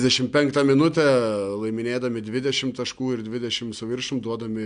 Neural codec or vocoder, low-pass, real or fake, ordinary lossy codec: none; 9.9 kHz; real; MP3, 64 kbps